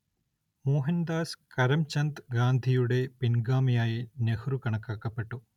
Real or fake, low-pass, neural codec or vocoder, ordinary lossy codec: real; 19.8 kHz; none; none